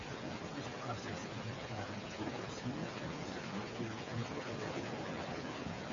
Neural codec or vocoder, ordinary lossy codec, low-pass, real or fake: codec, 16 kHz, 8 kbps, FreqCodec, smaller model; MP3, 32 kbps; 7.2 kHz; fake